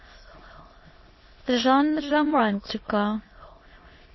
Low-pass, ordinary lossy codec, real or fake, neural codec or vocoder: 7.2 kHz; MP3, 24 kbps; fake; autoencoder, 22.05 kHz, a latent of 192 numbers a frame, VITS, trained on many speakers